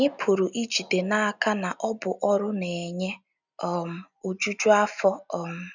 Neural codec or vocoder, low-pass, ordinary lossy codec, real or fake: none; 7.2 kHz; none; real